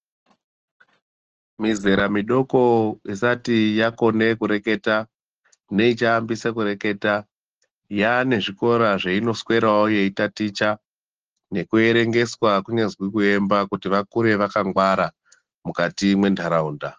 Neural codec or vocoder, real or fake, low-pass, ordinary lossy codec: none; real; 7.2 kHz; Opus, 16 kbps